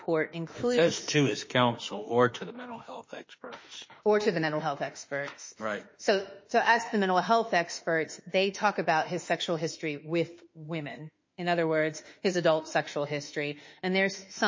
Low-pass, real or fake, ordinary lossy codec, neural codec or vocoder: 7.2 kHz; fake; MP3, 32 kbps; autoencoder, 48 kHz, 32 numbers a frame, DAC-VAE, trained on Japanese speech